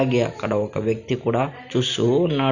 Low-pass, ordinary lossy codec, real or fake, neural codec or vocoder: 7.2 kHz; none; real; none